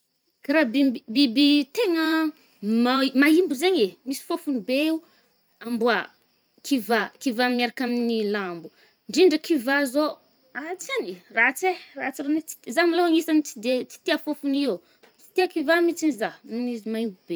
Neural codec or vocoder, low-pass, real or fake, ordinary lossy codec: vocoder, 44.1 kHz, 128 mel bands every 512 samples, BigVGAN v2; none; fake; none